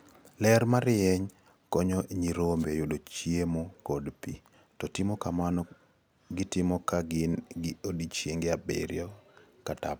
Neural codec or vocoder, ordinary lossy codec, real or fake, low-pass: none; none; real; none